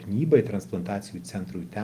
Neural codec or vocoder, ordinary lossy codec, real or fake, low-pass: none; Opus, 24 kbps; real; 14.4 kHz